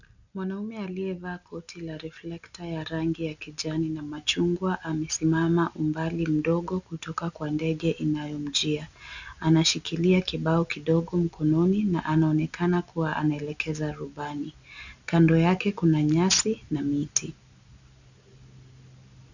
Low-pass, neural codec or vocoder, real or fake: 7.2 kHz; none; real